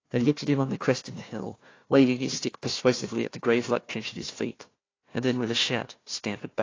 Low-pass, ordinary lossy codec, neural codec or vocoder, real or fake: 7.2 kHz; AAC, 32 kbps; codec, 16 kHz, 1 kbps, FunCodec, trained on Chinese and English, 50 frames a second; fake